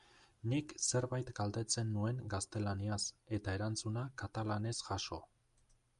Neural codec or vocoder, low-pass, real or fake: none; 10.8 kHz; real